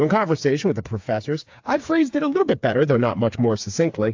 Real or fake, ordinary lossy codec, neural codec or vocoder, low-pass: fake; AAC, 48 kbps; codec, 16 kHz, 4 kbps, FreqCodec, smaller model; 7.2 kHz